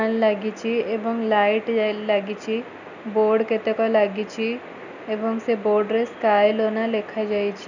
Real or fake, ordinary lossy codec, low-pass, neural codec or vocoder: real; none; 7.2 kHz; none